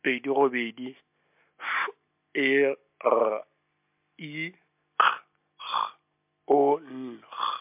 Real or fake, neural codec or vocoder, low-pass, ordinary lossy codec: real; none; 3.6 kHz; MP3, 32 kbps